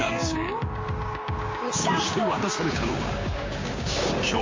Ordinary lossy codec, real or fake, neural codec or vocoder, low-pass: MP3, 32 kbps; fake; codec, 16 kHz, 6 kbps, DAC; 7.2 kHz